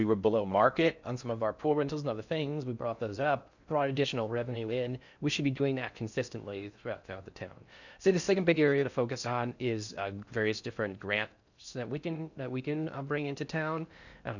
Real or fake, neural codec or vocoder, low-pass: fake; codec, 16 kHz in and 24 kHz out, 0.6 kbps, FocalCodec, streaming, 4096 codes; 7.2 kHz